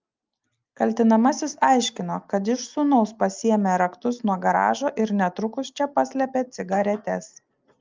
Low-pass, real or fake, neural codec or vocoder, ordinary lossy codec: 7.2 kHz; real; none; Opus, 24 kbps